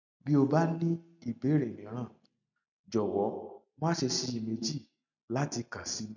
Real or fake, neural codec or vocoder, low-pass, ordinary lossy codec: real; none; 7.2 kHz; none